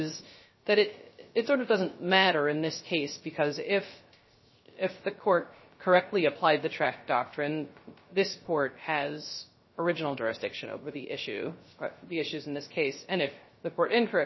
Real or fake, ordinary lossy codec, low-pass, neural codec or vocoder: fake; MP3, 24 kbps; 7.2 kHz; codec, 16 kHz, 0.3 kbps, FocalCodec